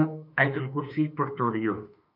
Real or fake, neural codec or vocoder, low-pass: fake; autoencoder, 48 kHz, 32 numbers a frame, DAC-VAE, trained on Japanese speech; 5.4 kHz